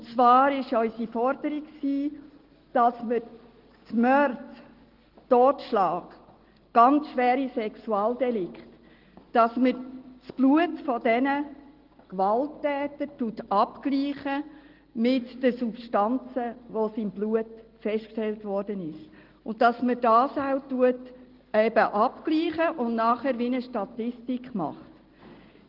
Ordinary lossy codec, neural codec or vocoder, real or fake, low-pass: Opus, 16 kbps; none; real; 5.4 kHz